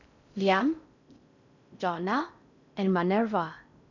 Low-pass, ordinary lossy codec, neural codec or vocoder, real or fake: 7.2 kHz; none; codec, 16 kHz in and 24 kHz out, 0.6 kbps, FocalCodec, streaming, 4096 codes; fake